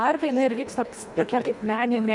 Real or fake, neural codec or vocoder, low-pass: fake; codec, 24 kHz, 1.5 kbps, HILCodec; 10.8 kHz